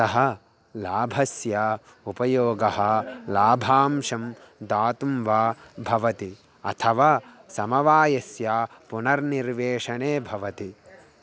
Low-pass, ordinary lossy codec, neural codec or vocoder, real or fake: none; none; none; real